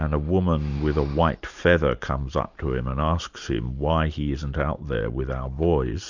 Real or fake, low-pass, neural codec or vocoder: fake; 7.2 kHz; vocoder, 44.1 kHz, 128 mel bands every 512 samples, BigVGAN v2